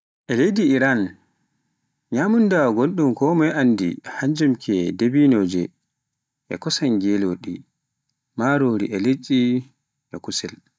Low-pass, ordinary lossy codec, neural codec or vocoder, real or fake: none; none; none; real